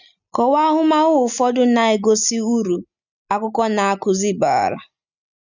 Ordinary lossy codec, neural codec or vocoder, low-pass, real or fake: none; none; 7.2 kHz; real